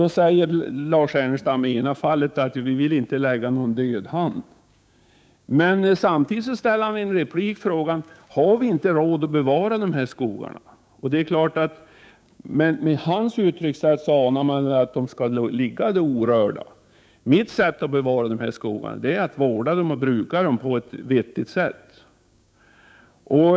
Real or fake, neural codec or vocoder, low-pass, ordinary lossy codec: fake; codec, 16 kHz, 6 kbps, DAC; none; none